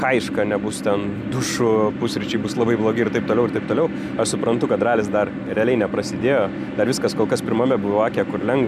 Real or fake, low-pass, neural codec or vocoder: real; 14.4 kHz; none